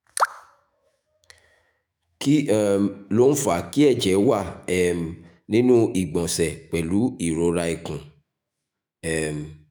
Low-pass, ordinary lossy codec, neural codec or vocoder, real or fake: none; none; autoencoder, 48 kHz, 128 numbers a frame, DAC-VAE, trained on Japanese speech; fake